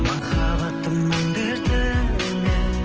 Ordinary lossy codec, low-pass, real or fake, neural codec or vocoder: Opus, 16 kbps; 7.2 kHz; real; none